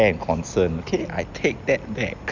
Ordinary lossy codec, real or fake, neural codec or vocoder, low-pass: none; real; none; 7.2 kHz